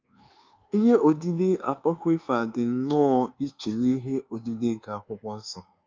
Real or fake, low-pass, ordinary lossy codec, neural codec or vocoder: fake; 7.2 kHz; Opus, 32 kbps; codec, 24 kHz, 1.2 kbps, DualCodec